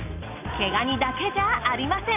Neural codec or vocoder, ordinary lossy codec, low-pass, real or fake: none; none; 3.6 kHz; real